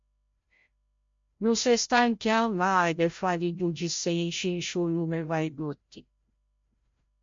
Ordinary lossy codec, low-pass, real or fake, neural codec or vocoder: MP3, 48 kbps; 7.2 kHz; fake; codec, 16 kHz, 0.5 kbps, FreqCodec, larger model